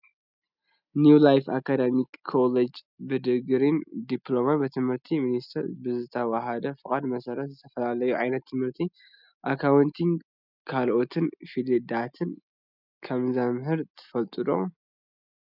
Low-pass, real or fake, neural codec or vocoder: 5.4 kHz; real; none